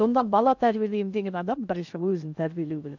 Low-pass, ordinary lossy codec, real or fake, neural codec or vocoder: 7.2 kHz; none; fake; codec, 16 kHz in and 24 kHz out, 0.6 kbps, FocalCodec, streaming, 2048 codes